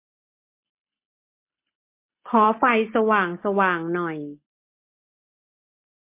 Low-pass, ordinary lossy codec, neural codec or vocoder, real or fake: 3.6 kHz; MP3, 24 kbps; none; real